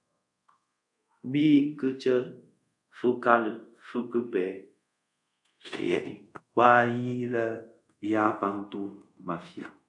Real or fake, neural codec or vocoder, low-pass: fake; codec, 24 kHz, 0.5 kbps, DualCodec; 10.8 kHz